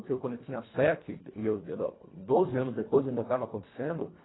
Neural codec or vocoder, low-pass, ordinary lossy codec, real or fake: codec, 24 kHz, 1.5 kbps, HILCodec; 7.2 kHz; AAC, 16 kbps; fake